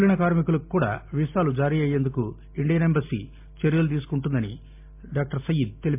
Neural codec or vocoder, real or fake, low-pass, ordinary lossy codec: none; real; 3.6 kHz; MP3, 24 kbps